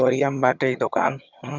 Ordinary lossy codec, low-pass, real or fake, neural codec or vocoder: none; 7.2 kHz; fake; vocoder, 22.05 kHz, 80 mel bands, HiFi-GAN